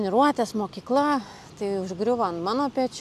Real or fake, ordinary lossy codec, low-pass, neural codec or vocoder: real; AAC, 96 kbps; 14.4 kHz; none